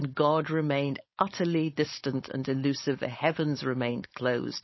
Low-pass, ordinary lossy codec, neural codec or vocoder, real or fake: 7.2 kHz; MP3, 24 kbps; codec, 16 kHz, 4.8 kbps, FACodec; fake